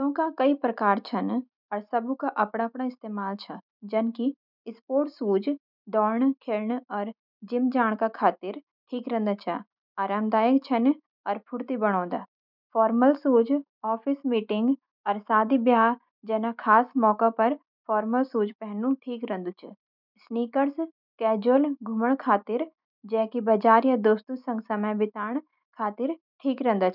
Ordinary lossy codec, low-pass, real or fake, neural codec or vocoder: none; 5.4 kHz; real; none